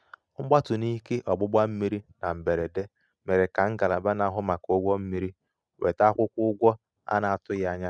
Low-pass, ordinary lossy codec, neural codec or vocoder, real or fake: none; none; none; real